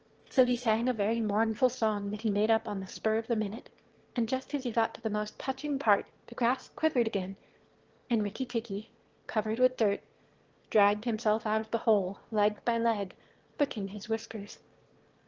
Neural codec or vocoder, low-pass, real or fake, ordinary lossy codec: autoencoder, 22.05 kHz, a latent of 192 numbers a frame, VITS, trained on one speaker; 7.2 kHz; fake; Opus, 16 kbps